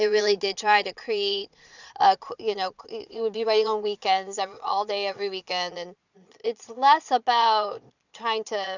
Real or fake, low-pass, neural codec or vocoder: fake; 7.2 kHz; vocoder, 22.05 kHz, 80 mel bands, Vocos